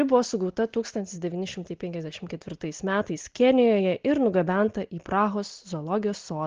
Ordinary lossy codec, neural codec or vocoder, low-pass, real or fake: Opus, 16 kbps; none; 7.2 kHz; real